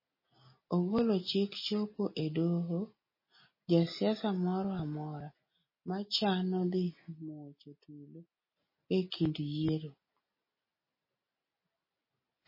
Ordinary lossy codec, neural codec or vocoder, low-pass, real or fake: MP3, 24 kbps; none; 5.4 kHz; real